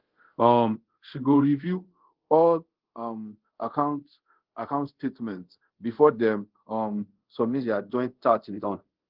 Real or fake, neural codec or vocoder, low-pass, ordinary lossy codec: fake; codec, 24 kHz, 0.5 kbps, DualCodec; 5.4 kHz; Opus, 16 kbps